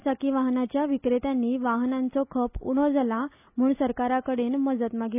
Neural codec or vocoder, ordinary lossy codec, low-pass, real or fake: none; AAC, 32 kbps; 3.6 kHz; real